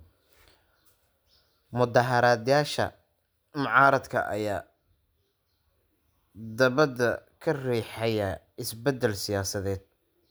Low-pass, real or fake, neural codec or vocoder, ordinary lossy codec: none; real; none; none